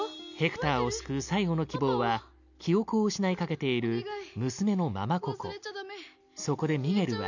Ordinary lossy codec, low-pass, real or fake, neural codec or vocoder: none; 7.2 kHz; real; none